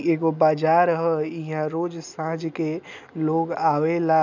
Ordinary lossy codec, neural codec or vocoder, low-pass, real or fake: none; none; 7.2 kHz; real